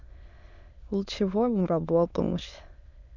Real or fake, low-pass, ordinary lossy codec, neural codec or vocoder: fake; 7.2 kHz; none; autoencoder, 22.05 kHz, a latent of 192 numbers a frame, VITS, trained on many speakers